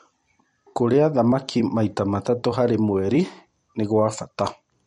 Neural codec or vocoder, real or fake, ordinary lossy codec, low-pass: vocoder, 44.1 kHz, 128 mel bands every 256 samples, BigVGAN v2; fake; MP3, 48 kbps; 19.8 kHz